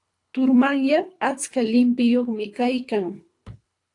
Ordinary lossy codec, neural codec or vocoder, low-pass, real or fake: AAC, 48 kbps; codec, 24 kHz, 3 kbps, HILCodec; 10.8 kHz; fake